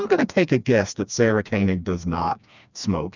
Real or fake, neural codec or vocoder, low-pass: fake; codec, 16 kHz, 2 kbps, FreqCodec, smaller model; 7.2 kHz